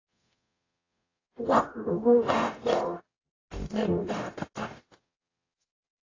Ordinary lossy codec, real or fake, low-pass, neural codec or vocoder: AAC, 32 kbps; fake; 7.2 kHz; codec, 44.1 kHz, 0.9 kbps, DAC